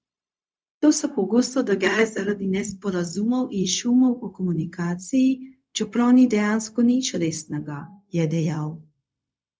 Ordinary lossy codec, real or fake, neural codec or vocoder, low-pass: none; fake; codec, 16 kHz, 0.4 kbps, LongCat-Audio-Codec; none